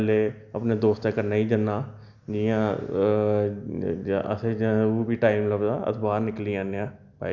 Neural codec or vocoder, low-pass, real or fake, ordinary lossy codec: none; 7.2 kHz; real; none